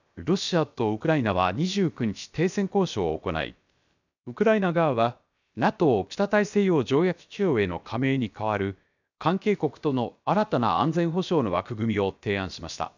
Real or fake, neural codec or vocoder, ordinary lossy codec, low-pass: fake; codec, 16 kHz, about 1 kbps, DyCAST, with the encoder's durations; none; 7.2 kHz